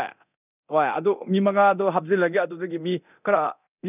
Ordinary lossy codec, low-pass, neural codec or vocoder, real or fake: none; 3.6 kHz; codec, 24 kHz, 0.9 kbps, DualCodec; fake